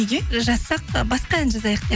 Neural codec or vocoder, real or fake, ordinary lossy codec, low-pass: none; real; none; none